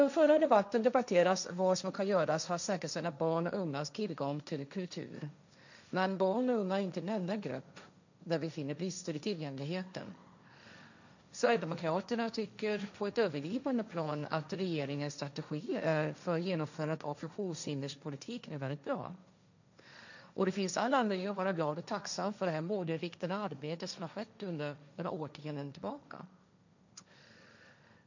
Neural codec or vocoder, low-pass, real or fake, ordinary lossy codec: codec, 16 kHz, 1.1 kbps, Voila-Tokenizer; 7.2 kHz; fake; none